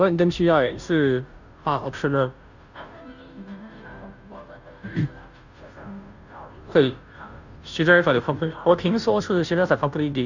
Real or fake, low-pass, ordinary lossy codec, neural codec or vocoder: fake; 7.2 kHz; none; codec, 16 kHz, 0.5 kbps, FunCodec, trained on Chinese and English, 25 frames a second